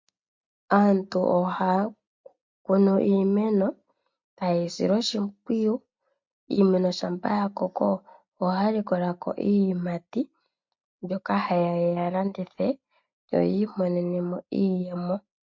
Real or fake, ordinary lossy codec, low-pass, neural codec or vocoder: real; MP3, 48 kbps; 7.2 kHz; none